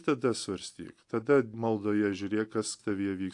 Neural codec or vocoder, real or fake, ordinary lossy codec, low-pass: none; real; AAC, 64 kbps; 10.8 kHz